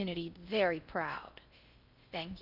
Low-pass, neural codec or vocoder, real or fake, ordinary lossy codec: 5.4 kHz; codec, 16 kHz in and 24 kHz out, 0.6 kbps, FocalCodec, streaming, 4096 codes; fake; AAC, 48 kbps